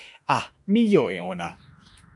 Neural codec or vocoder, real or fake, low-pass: autoencoder, 48 kHz, 32 numbers a frame, DAC-VAE, trained on Japanese speech; fake; 10.8 kHz